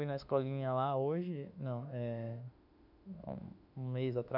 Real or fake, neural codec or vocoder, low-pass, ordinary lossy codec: fake; autoencoder, 48 kHz, 32 numbers a frame, DAC-VAE, trained on Japanese speech; 5.4 kHz; none